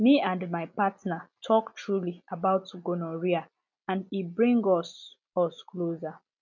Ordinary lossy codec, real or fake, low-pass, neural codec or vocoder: none; real; 7.2 kHz; none